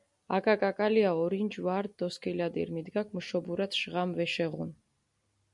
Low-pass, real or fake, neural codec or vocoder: 10.8 kHz; real; none